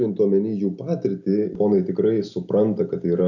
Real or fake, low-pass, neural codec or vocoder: real; 7.2 kHz; none